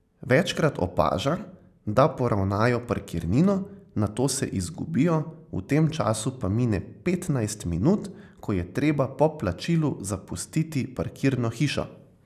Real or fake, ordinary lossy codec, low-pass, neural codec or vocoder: real; none; 14.4 kHz; none